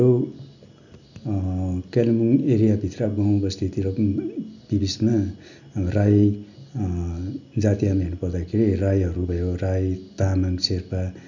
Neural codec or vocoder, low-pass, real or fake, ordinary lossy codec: none; 7.2 kHz; real; none